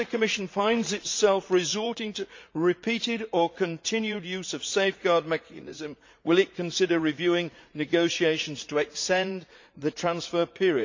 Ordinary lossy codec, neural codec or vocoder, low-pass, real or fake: MP3, 48 kbps; vocoder, 44.1 kHz, 80 mel bands, Vocos; 7.2 kHz; fake